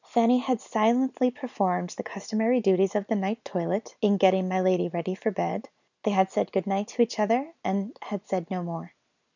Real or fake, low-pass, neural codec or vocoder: real; 7.2 kHz; none